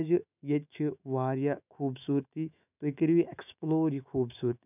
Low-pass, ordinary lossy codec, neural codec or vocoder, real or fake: 3.6 kHz; none; autoencoder, 48 kHz, 128 numbers a frame, DAC-VAE, trained on Japanese speech; fake